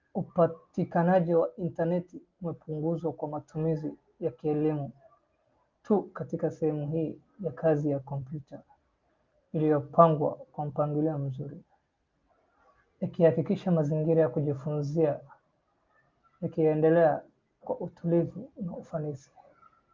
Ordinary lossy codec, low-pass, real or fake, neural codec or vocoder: Opus, 24 kbps; 7.2 kHz; real; none